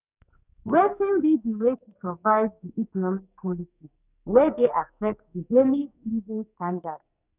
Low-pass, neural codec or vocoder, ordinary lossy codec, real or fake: 3.6 kHz; codec, 44.1 kHz, 2.6 kbps, SNAC; none; fake